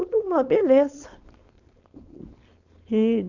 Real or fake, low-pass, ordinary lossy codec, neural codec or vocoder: fake; 7.2 kHz; none; codec, 16 kHz, 4.8 kbps, FACodec